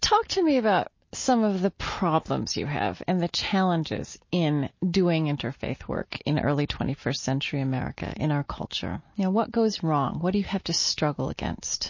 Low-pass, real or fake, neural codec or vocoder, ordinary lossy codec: 7.2 kHz; real; none; MP3, 32 kbps